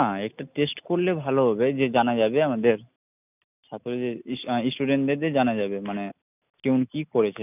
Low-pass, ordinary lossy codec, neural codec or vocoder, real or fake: 3.6 kHz; none; none; real